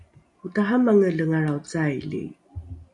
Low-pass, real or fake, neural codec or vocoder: 10.8 kHz; real; none